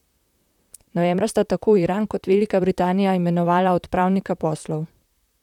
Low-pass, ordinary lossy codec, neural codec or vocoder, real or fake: 19.8 kHz; none; vocoder, 44.1 kHz, 128 mel bands, Pupu-Vocoder; fake